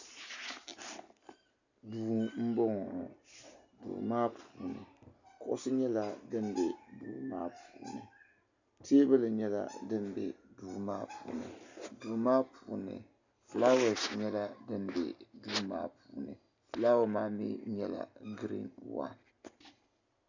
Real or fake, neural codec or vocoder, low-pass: real; none; 7.2 kHz